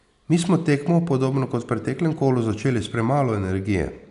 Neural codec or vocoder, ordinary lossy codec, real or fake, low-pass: none; none; real; 10.8 kHz